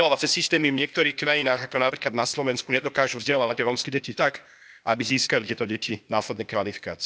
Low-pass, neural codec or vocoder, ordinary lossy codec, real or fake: none; codec, 16 kHz, 0.8 kbps, ZipCodec; none; fake